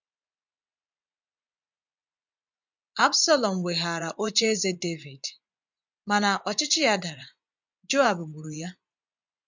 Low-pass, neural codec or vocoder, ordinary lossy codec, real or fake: 7.2 kHz; vocoder, 24 kHz, 100 mel bands, Vocos; MP3, 64 kbps; fake